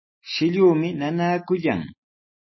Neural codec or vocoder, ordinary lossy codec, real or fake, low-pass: none; MP3, 24 kbps; real; 7.2 kHz